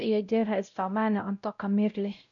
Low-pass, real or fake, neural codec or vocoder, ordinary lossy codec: 7.2 kHz; fake; codec, 16 kHz, 0.5 kbps, X-Codec, WavLM features, trained on Multilingual LibriSpeech; none